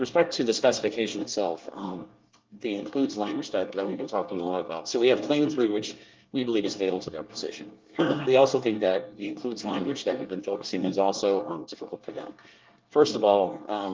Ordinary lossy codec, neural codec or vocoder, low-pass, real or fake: Opus, 32 kbps; codec, 24 kHz, 1 kbps, SNAC; 7.2 kHz; fake